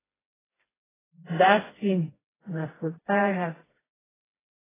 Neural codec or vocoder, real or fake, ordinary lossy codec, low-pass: codec, 16 kHz, 1 kbps, FreqCodec, smaller model; fake; AAC, 16 kbps; 3.6 kHz